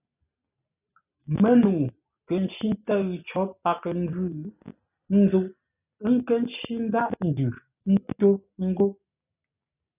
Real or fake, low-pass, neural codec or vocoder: real; 3.6 kHz; none